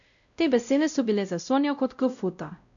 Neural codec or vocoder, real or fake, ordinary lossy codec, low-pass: codec, 16 kHz, 0.5 kbps, X-Codec, WavLM features, trained on Multilingual LibriSpeech; fake; none; 7.2 kHz